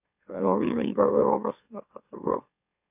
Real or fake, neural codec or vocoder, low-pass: fake; autoencoder, 44.1 kHz, a latent of 192 numbers a frame, MeloTTS; 3.6 kHz